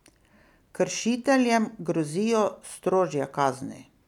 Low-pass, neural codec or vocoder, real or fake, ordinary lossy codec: 19.8 kHz; none; real; none